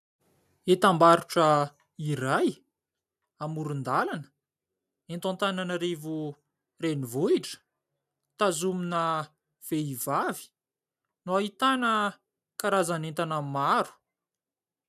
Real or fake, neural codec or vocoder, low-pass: real; none; 14.4 kHz